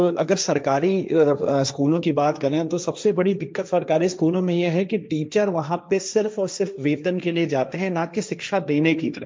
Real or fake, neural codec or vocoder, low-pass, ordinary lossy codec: fake; codec, 16 kHz, 1.1 kbps, Voila-Tokenizer; none; none